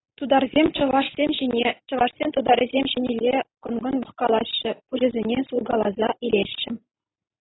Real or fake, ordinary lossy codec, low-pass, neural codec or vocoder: real; AAC, 16 kbps; 7.2 kHz; none